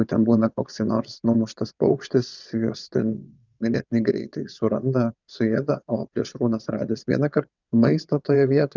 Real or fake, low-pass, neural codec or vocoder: fake; 7.2 kHz; vocoder, 22.05 kHz, 80 mel bands, WaveNeXt